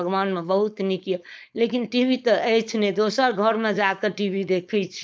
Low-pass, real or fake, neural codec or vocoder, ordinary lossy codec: none; fake; codec, 16 kHz, 4.8 kbps, FACodec; none